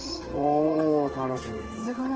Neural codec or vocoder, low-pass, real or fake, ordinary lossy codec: codec, 16 kHz in and 24 kHz out, 1 kbps, XY-Tokenizer; 7.2 kHz; fake; Opus, 16 kbps